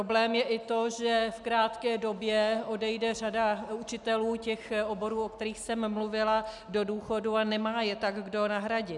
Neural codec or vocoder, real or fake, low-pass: none; real; 10.8 kHz